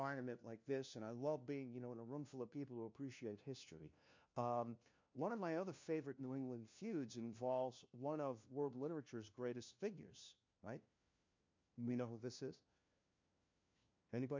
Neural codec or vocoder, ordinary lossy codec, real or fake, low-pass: codec, 16 kHz, 1 kbps, FunCodec, trained on LibriTTS, 50 frames a second; MP3, 48 kbps; fake; 7.2 kHz